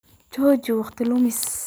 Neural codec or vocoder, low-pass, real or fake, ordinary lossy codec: none; none; real; none